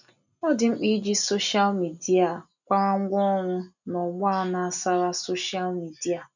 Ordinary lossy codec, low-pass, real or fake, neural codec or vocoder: none; 7.2 kHz; real; none